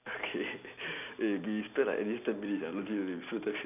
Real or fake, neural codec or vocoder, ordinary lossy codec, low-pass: real; none; none; 3.6 kHz